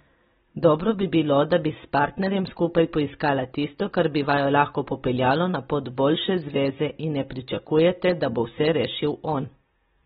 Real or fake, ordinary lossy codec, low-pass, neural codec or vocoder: real; AAC, 16 kbps; 19.8 kHz; none